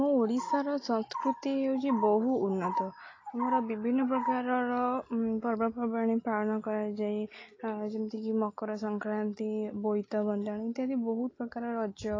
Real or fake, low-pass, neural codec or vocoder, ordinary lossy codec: real; 7.2 kHz; none; AAC, 32 kbps